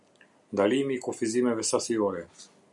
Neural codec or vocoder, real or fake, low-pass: none; real; 10.8 kHz